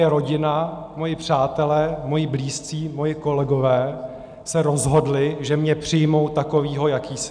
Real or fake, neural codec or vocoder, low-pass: real; none; 9.9 kHz